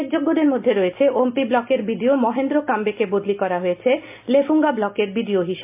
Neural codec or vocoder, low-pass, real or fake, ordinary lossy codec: none; 3.6 kHz; real; MP3, 32 kbps